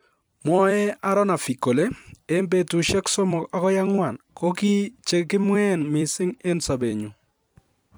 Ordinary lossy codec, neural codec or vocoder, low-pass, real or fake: none; vocoder, 44.1 kHz, 128 mel bands every 256 samples, BigVGAN v2; none; fake